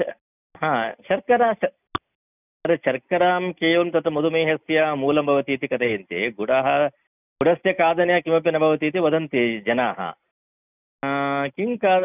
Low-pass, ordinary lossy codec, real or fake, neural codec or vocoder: 3.6 kHz; none; real; none